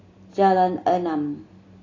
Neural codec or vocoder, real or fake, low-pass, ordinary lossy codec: none; real; 7.2 kHz; AAC, 32 kbps